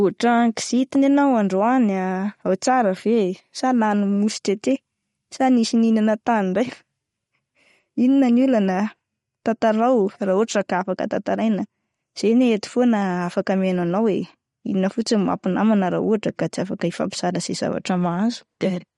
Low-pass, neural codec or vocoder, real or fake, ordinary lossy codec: 19.8 kHz; none; real; MP3, 48 kbps